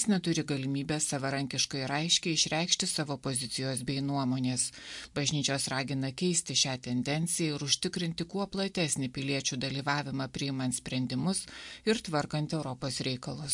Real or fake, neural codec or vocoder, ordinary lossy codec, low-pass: real; none; MP3, 64 kbps; 10.8 kHz